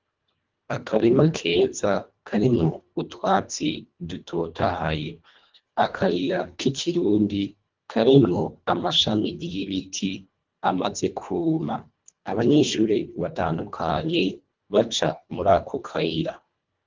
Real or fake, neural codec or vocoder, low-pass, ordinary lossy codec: fake; codec, 24 kHz, 1.5 kbps, HILCodec; 7.2 kHz; Opus, 32 kbps